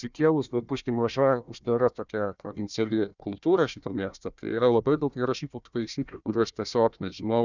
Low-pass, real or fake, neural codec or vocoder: 7.2 kHz; fake; codec, 16 kHz, 1 kbps, FunCodec, trained on Chinese and English, 50 frames a second